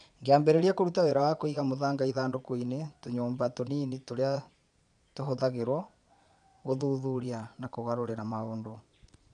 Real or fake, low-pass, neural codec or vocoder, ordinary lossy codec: fake; 9.9 kHz; vocoder, 22.05 kHz, 80 mel bands, Vocos; none